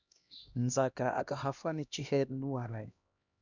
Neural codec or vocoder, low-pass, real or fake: codec, 16 kHz, 1 kbps, X-Codec, HuBERT features, trained on LibriSpeech; 7.2 kHz; fake